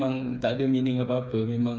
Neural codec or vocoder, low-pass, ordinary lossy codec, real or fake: codec, 16 kHz, 4 kbps, FreqCodec, smaller model; none; none; fake